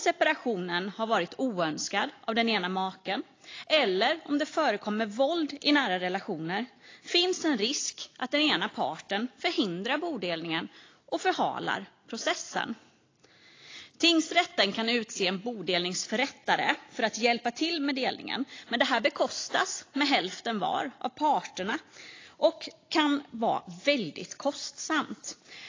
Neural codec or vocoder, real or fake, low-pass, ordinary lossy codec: none; real; 7.2 kHz; AAC, 32 kbps